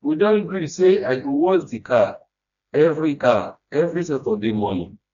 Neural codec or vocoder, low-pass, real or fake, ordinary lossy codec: codec, 16 kHz, 1 kbps, FreqCodec, smaller model; 7.2 kHz; fake; none